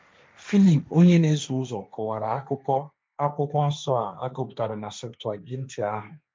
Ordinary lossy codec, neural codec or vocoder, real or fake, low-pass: none; codec, 16 kHz, 1.1 kbps, Voila-Tokenizer; fake; none